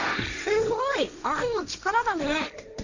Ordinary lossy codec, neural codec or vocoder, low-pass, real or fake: none; codec, 16 kHz, 1.1 kbps, Voila-Tokenizer; 7.2 kHz; fake